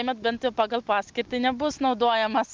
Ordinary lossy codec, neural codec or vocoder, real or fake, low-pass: Opus, 24 kbps; none; real; 7.2 kHz